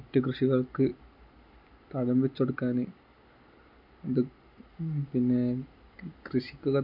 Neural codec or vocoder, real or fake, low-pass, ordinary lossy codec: none; real; 5.4 kHz; none